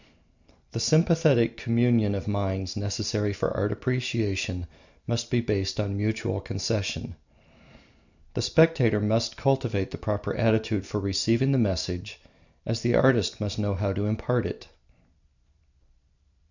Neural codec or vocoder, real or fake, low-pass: none; real; 7.2 kHz